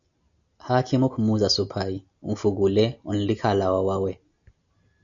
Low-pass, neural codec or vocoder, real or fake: 7.2 kHz; none; real